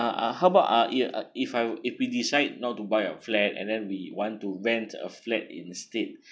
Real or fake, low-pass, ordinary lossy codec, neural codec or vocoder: real; none; none; none